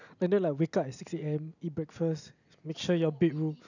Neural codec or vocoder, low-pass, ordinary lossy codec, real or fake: none; 7.2 kHz; none; real